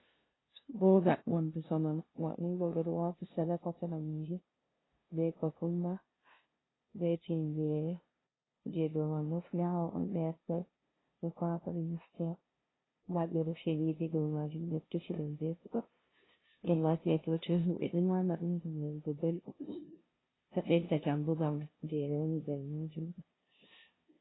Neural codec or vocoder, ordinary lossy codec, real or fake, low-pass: codec, 16 kHz, 0.5 kbps, FunCodec, trained on LibriTTS, 25 frames a second; AAC, 16 kbps; fake; 7.2 kHz